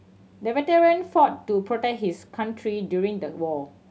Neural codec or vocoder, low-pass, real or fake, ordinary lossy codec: none; none; real; none